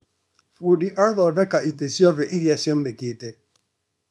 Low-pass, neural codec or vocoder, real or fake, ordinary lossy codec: none; codec, 24 kHz, 0.9 kbps, WavTokenizer, small release; fake; none